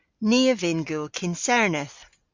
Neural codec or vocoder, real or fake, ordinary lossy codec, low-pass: none; real; MP3, 64 kbps; 7.2 kHz